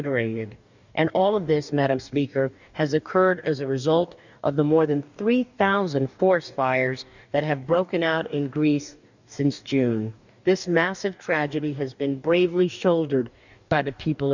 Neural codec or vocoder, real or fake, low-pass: codec, 44.1 kHz, 2.6 kbps, DAC; fake; 7.2 kHz